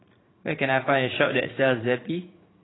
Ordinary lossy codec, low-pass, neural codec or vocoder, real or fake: AAC, 16 kbps; 7.2 kHz; vocoder, 44.1 kHz, 80 mel bands, Vocos; fake